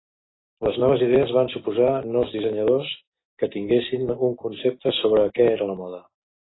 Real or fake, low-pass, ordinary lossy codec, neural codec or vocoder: real; 7.2 kHz; AAC, 16 kbps; none